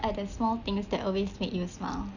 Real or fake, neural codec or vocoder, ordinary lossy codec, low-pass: real; none; none; 7.2 kHz